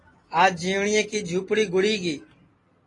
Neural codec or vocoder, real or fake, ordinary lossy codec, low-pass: none; real; AAC, 32 kbps; 10.8 kHz